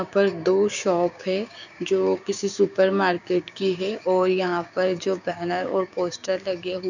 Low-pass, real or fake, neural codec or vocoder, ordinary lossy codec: 7.2 kHz; fake; codec, 16 kHz, 6 kbps, DAC; none